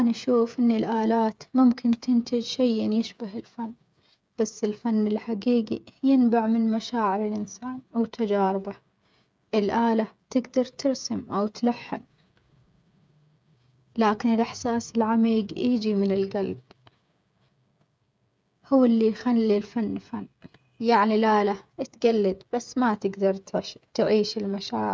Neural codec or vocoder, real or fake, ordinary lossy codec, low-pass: codec, 16 kHz, 8 kbps, FreqCodec, smaller model; fake; none; none